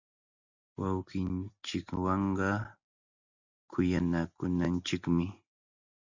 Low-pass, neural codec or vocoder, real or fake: 7.2 kHz; none; real